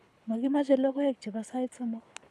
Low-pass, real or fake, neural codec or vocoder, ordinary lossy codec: none; fake; codec, 24 kHz, 6 kbps, HILCodec; none